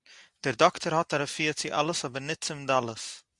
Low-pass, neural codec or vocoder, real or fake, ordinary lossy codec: 10.8 kHz; none; real; Opus, 64 kbps